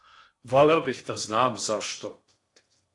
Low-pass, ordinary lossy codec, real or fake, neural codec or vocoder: 10.8 kHz; AAC, 48 kbps; fake; codec, 16 kHz in and 24 kHz out, 0.6 kbps, FocalCodec, streaming, 2048 codes